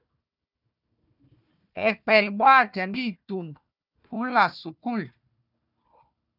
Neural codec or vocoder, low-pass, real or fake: codec, 16 kHz, 1 kbps, FunCodec, trained on Chinese and English, 50 frames a second; 5.4 kHz; fake